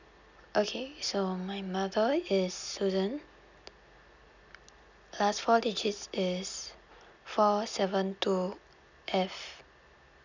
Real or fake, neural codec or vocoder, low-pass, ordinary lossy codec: real; none; 7.2 kHz; none